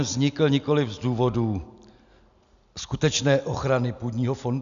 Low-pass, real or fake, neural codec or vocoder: 7.2 kHz; real; none